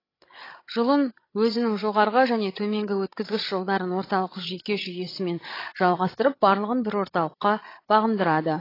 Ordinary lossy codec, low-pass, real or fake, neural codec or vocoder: AAC, 24 kbps; 5.4 kHz; fake; codec, 16 kHz, 16 kbps, FreqCodec, larger model